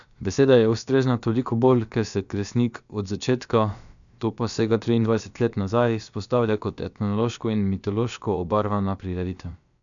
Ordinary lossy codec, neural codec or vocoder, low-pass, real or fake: none; codec, 16 kHz, about 1 kbps, DyCAST, with the encoder's durations; 7.2 kHz; fake